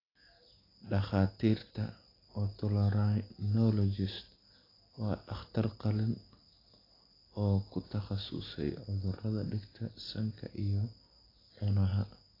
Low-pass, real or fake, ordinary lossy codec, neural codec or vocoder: 5.4 kHz; real; AAC, 24 kbps; none